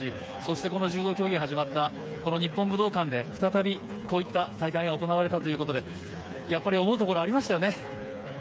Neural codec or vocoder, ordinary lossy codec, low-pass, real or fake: codec, 16 kHz, 4 kbps, FreqCodec, smaller model; none; none; fake